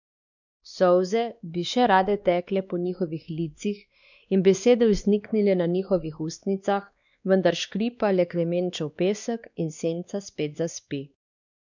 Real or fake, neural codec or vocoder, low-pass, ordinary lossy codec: fake; codec, 16 kHz, 2 kbps, X-Codec, WavLM features, trained on Multilingual LibriSpeech; 7.2 kHz; none